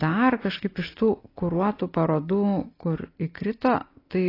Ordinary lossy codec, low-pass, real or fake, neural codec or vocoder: AAC, 24 kbps; 5.4 kHz; real; none